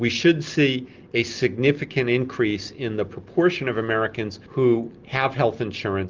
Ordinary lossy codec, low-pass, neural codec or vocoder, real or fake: Opus, 32 kbps; 7.2 kHz; none; real